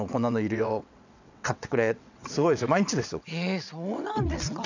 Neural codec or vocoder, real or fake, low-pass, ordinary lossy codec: vocoder, 22.05 kHz, 80 mel bands, WaveNeXt; fake; 7.2 kHz; none